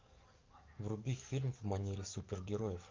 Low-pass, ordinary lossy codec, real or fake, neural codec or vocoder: 7.2 kHz; Opus, 24 kbps; fake; codec, 44.1 kHz, 7.8 kbps, DAC